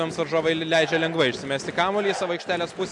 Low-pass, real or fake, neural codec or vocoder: 10.8 kHz; real; none